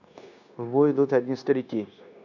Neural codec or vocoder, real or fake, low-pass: codec, 16 kHz, 0.9 kbps, LongCat-Audio-Codec; fake; 7.2 kHz